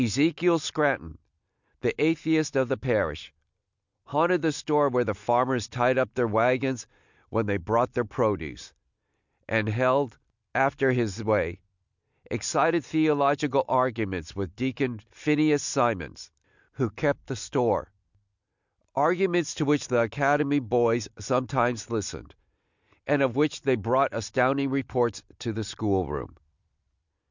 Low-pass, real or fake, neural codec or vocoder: 7.2 kHz; real; none